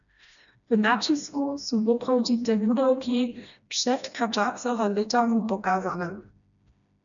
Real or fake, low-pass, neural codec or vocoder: fake; 7.2 kHz; codec, 16 kHz, 1 kbps, FreqCodec, smaller model